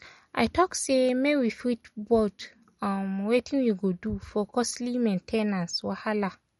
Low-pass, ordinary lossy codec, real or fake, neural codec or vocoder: 19.8 kHz; MP3, 48 kbps; real; none